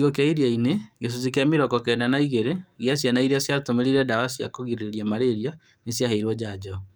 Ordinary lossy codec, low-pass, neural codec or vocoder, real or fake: none; none; codec, 44.1 kHz, 7.8 kbps, DAC; fake